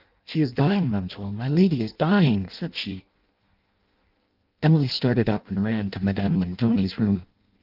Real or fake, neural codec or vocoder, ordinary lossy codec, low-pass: fake; codec, 16 kHz in and 24 kHz out, 0.6 kbps, FireRedTTS-2 codec; Opus, 24 kbps; 5.4 kHz